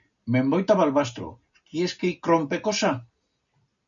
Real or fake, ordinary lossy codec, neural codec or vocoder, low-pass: real; MP3, 96 kbps; none; 7.2 kHz